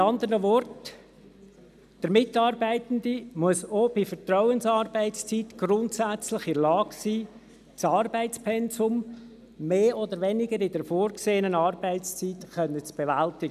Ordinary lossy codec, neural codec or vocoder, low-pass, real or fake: none; none; 14.4 kHz; real